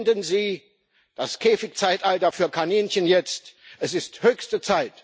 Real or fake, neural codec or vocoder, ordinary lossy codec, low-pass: real; none; none; none